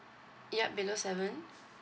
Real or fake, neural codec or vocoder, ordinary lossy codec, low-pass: real; none; none; none